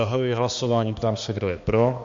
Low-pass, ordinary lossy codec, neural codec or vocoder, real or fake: 7.2 kHz; MP3, 48 kbps; codec, 16 kHz, 2 kbps, X-Codec, HuBERT features, trained on balanced general audio; fake